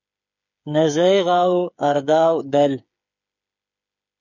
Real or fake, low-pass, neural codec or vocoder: fake; 7.2 kHz; codec, 16 kHz, 8 kbps, FreqCodec, smaller model